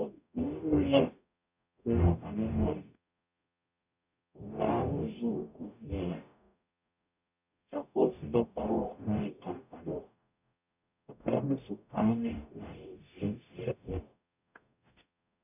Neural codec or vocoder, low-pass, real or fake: codec, 44.1 kHz, 0.9 kbps, DAC; 3.6 kHz; fake